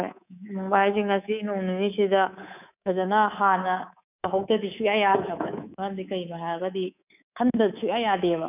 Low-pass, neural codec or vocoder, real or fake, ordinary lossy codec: 3.6 kHz; codec, 24 kHz, 3.1 kbps, DualCodec; fake; none